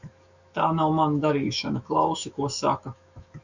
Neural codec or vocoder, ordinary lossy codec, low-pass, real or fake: autoencoder, 48 kHz, 128 numbers a frame, DAC-VAE, trained on Japanese speech; Opus, 64 kbps; 7.2 kHz; fake